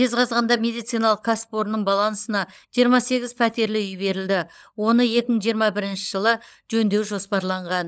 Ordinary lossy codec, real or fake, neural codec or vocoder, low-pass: none; fake; codec, 16 kHz, 16 kbps, FunCodec, trained on LibriTTS, 50 frames a second; none